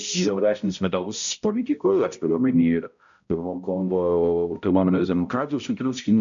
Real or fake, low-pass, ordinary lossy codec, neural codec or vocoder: fake; 7.2 kHz; MP3, 64 kbps; codec, 16 kHz, 0.5 kbps, X-Codec, HuBERT features, trained on balanced general audio